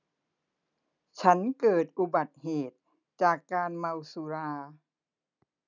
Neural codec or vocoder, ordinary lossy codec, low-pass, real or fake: none; none; 7.2 kHz; real